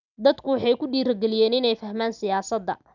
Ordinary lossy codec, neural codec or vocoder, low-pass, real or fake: none; none; 7.2 kHz; real